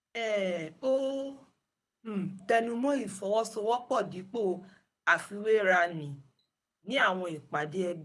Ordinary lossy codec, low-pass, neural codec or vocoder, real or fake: none; none; codec, 24 kHz, 6 kbps, HILCodec; fake